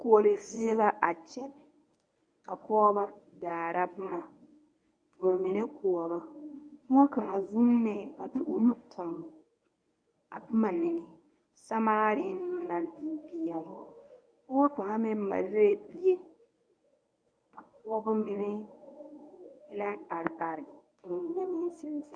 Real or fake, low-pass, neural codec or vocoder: fake; 9.9 kHz; codec, 24 kHz, 0.9 kbps, WavTokenizer, medium speech release version 1